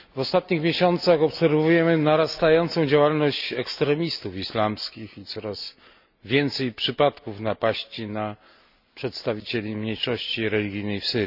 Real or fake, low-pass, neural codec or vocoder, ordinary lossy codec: real; 5.4 kHz; none; none